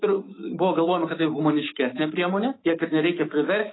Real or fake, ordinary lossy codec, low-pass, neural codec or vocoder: fake; AAC, 16 kbps; 7.2 kHz; autoencoder, 48 kHz, 128 numbers a frame, DAC-VAE, trained on Japanese speech